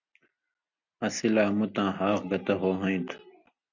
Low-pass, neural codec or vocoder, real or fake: 7.2 kHz; none; real